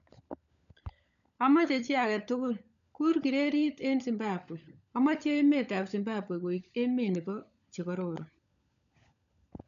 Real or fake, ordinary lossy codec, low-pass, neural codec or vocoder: fake; none; 7.2 kHz; codec, 16 kHz, 16 kbps, FunCodec, trained on LibriTTS, 50 frames a second